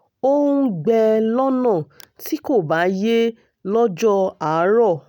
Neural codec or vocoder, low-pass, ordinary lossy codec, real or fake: none; 19.8 kHz; none; real